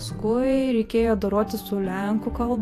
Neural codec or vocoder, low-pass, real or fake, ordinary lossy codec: vocoder, 48 kHz, 128 mel bands, Vocos; 14.4 kHz; fake; AAC, 64 kbps